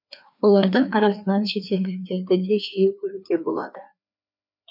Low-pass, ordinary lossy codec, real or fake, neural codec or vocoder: 5.4 kHz; none; fake; codec, 16 kHz, 2 kbps, FreqCodec, larger model